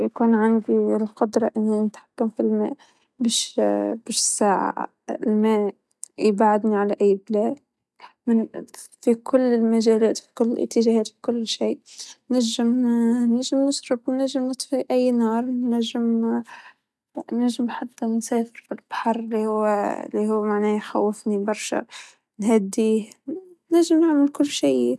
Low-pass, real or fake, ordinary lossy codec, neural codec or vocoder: none; real; none; none